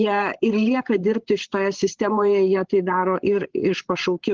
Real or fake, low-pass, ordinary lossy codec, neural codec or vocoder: fake; 7.2 kHz; Opus, 16 kbps; codec, 16 kHz, 8 kbps, FreqCodec, larger model